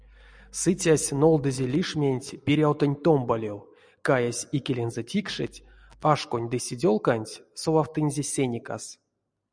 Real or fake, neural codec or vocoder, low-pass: real; none; 9.9 kHz